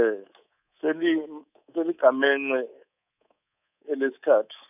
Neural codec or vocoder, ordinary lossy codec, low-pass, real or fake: codec, 24 kHz, 3.1 kbps, DualCodec; none; 3.6 kHz; fake